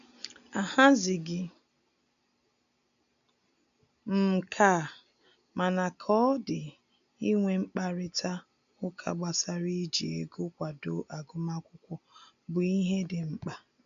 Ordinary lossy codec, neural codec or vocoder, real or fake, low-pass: none; none; real; 7.2 kHz